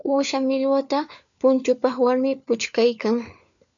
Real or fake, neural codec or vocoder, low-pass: fake; codec, 16 kHz, 4 kbps, FunCodec, trained on LibriTTS, 50 frames a second; 7.2 kHz